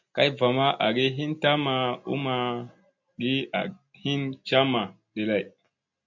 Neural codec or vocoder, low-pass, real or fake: none; 7.2 kHz; real